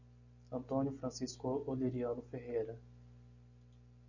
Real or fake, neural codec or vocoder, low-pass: real; none; 7.2 kHz